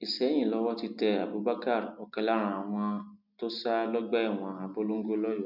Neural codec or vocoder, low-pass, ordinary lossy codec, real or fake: none; 5.4 kHz; none; real